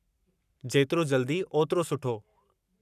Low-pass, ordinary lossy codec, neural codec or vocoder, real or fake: 14.4 kHz; none; codec, 44.1 kHz, 7.8 kbps, Pupu-Codec; fake